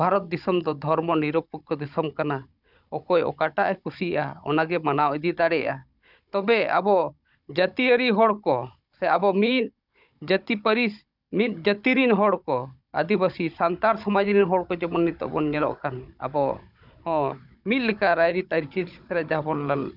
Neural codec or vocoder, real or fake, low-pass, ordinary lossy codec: codec, 16 kHz, 16 kbps, FunCodec, trained on Chinese and English, 50 frames a second; fake; 5.4 kHz; none